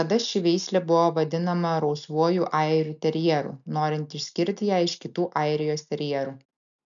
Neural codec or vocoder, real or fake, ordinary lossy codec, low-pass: none; real; MP3, 96 kbps; 7.2 kHz